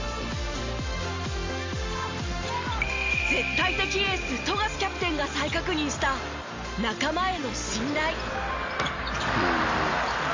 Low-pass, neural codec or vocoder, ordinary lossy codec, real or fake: 7.2 kHz; none; MP3, 64 kbps; real